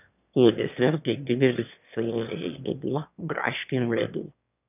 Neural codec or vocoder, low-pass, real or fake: autoencoder, 22.05 kHz, a latent of 192 numbers a frame, VITS, trained on one speaker; 3.6 kHz; fake